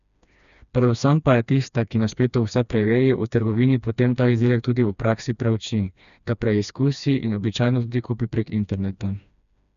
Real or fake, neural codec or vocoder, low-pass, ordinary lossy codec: fake; codec, 16 kHz, 2 kbps, FreqCodec, smaller model; 7.2 kHz; none